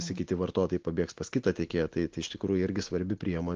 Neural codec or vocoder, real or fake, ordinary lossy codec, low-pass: none; real; Opus, 16 kbps; 7.2 kHz